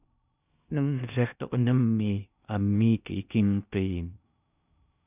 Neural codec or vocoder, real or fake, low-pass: codec, 16 kHz in and 24 kHz out, 0.6 kbps, FocalCodec, streaming, 2048 codes; fake; 3.6 kHz